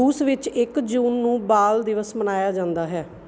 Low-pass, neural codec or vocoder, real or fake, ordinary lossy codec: none; none; real; none